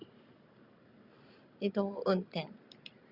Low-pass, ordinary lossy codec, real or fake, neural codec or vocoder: 5.4 kHz; Opus, 64 kbps; real; none